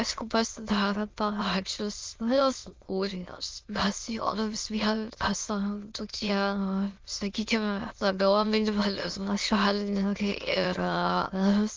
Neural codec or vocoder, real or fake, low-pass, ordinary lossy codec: autoencoder, 22.05 kHz, a latent of 192 numbers a frame, VITS, trained on many speakers; fake; 7.2 kHz; Opus, 24 kbps